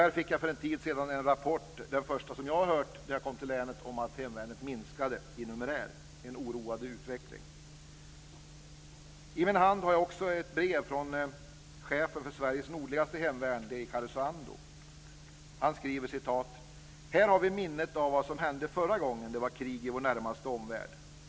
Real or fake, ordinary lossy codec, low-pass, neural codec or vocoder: real; none; none; none